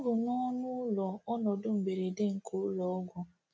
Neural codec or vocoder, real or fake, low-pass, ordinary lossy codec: none; real; none; none